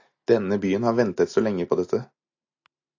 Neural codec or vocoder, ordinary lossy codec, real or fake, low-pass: none; AAC, 48 kbps; real; 7.2 kHz